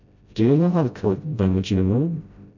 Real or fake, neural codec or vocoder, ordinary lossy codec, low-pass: fake; codec, 16 kHz, 0.5 kbps, FreqCodec, smaller model; none; 7.2 kHz